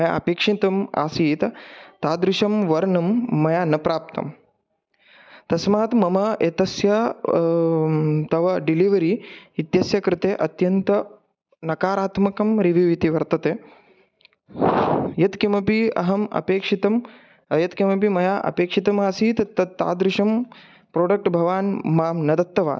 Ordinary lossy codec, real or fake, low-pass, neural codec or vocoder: none; real; none; none